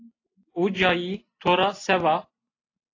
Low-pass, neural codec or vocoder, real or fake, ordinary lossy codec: 7.2 kHz; none; real; AAC, 32 kbps